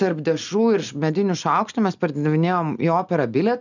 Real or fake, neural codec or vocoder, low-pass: real; none; 7.2 kHz